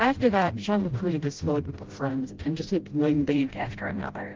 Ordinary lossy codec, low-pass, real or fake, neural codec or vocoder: Opus, 16 kbps; 7.2 kHz; fake; codec, 16 kHz, 0.5 kbps, FreqCodec, smaller model